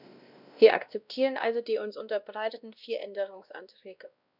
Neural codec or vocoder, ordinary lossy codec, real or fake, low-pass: codec, 16 kHz, 1 kbps, X-Codec, WavLM features, trained on Multilingual LibriSpeech; none; fake; 5.4 kHz